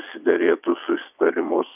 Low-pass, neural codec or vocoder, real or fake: 3.6 kHz; vocoder, 22.05 kHz, 80 mel bands, WaveNeXt; fake